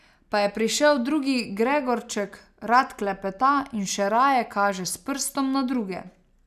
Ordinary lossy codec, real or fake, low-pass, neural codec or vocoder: none; real; 14.4 kHz; none